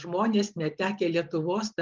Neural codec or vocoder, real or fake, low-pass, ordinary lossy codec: none; real; 7.2 kHz; Opus, 32 kbps